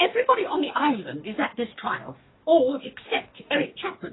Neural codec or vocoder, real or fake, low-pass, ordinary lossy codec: codec, 44.1 kHz, 2.6 kbps, DAC; fake; 7.2 kHz; AAC, 16 kbps